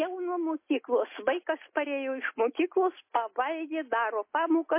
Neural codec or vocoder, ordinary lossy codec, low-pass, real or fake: none; MP3, 24 kbps; 3.6 kHz; real